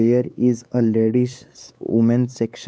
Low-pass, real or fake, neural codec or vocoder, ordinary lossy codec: none; fake; codec, 16 kHz, 4 kbps, X-Codec, WavLM features, trained on Multilingual LibriSpeech; none